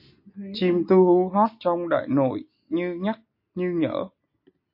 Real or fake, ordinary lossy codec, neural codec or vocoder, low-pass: real; MP3, 32 kbps; none; 5.4 kHz